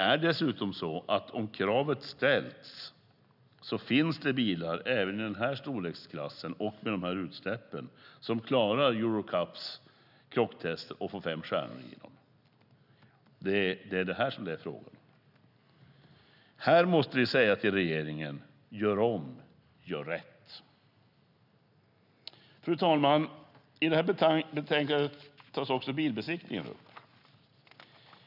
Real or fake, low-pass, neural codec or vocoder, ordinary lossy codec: real; 5.4 kHz; none; none